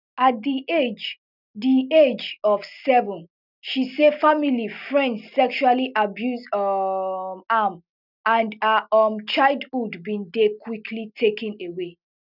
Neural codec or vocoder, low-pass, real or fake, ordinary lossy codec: none; 5.4 kHz; real; none